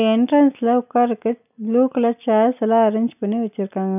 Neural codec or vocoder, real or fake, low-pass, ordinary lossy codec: none; real; 3.6 kHz; none